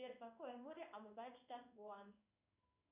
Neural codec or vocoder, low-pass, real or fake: codec, 16 kHz in and 24 kHz out, 1 kbps, XY-Tokenizer; 3.6 kHz; fake